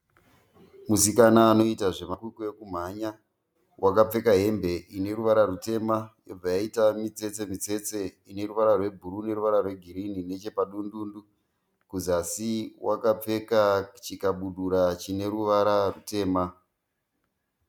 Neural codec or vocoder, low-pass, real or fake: none; 19.8 kHz; real